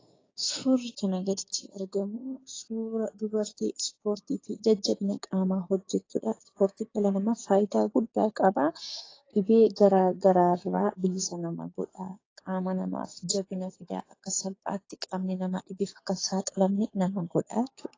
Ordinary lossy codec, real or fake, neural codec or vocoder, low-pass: AAC, 32 kbps; fake; codec, 16 kHz, 6 kbps, DAC; 7.2 kHz